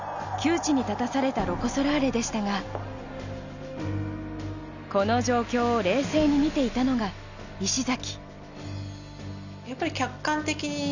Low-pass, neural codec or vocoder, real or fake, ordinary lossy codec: 7.2 kHz; none; real; none